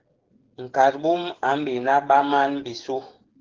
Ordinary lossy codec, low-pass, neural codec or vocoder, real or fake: Opus, 16 kbps; 7.2 kHz; codec, 16 kHz, 8 kbps, FreqCodec, smaller model; fake